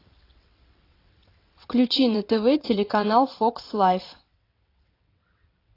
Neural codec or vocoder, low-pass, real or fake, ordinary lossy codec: vocoder, 22.05 kHz, 80 mel bands, WaveNeXt; 5.4 kHz; fake; AAC, 32 kbps